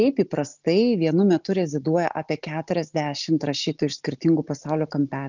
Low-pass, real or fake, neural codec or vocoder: 7.2 kHz; real; none